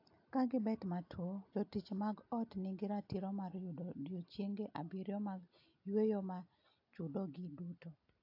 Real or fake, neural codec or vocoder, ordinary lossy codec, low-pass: real; none; AAC, 48 kbps; 5.4 kHz